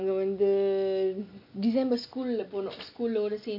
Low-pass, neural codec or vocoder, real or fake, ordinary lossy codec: 5.4 kHz; none; real; none